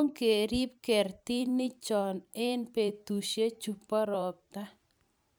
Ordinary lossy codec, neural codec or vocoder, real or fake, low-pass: none; vocoder, 44.1 kHz, 128 mel bands every 512 samples, BigVGAN v2; fake; none